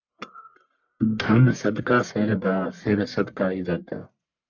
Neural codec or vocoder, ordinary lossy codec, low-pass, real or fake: codec, 44.1 kHz, 1.7 kbps, Pupu-Codec; MP3, 64 kbps; 7.2 kHz; fake